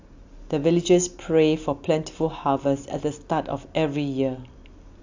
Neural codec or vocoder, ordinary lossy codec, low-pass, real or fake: none; AAC, 48 kbps; 7.2 kHz; real